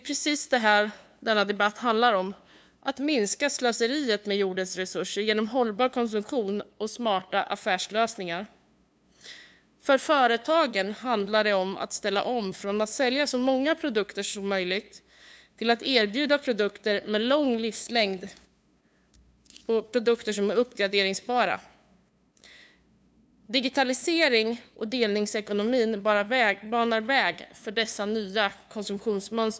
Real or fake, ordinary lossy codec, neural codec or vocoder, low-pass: fake; none; codec, 16 kHz, 2 kbps, FunCodec, trained on LibriTTS, 25 frames a second; none